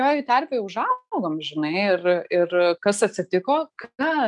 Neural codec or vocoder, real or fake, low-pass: none; real; 10.8 kHz